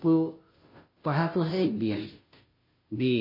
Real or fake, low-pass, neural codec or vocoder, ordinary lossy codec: fake; 5.4 kHz; codec, 16 kHz, 0.5 kbps, FunCodec, trained on Chinese and English, 25 frames a second; MP3, 24 kbps